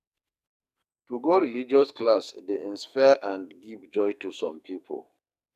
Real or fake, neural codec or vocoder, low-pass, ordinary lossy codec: fake; codec, 44.1 kHz, 2.6 kbps, SNAC; 14.4 kHz; none